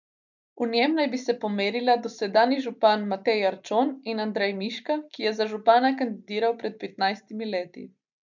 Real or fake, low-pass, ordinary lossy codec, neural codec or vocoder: real; 7.2 kHz; none; none